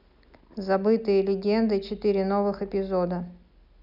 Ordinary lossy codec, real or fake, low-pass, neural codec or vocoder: none; real; 5.4 kHz; none